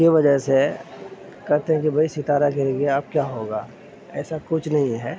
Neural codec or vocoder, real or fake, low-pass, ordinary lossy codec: none; real; none; none